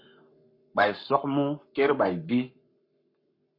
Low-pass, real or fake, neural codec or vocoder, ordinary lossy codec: 5.4 kHz; fake; codec, 44.1 kHz, 7.8 kbps, Pupu-Codec; MP3, 32 kbps